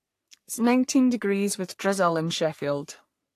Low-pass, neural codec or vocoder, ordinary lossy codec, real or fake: 14.4 kHz; codec, 44.1 kHz, 3.4 kbps, Pupu-Codec; AAC, 64 kbps; fake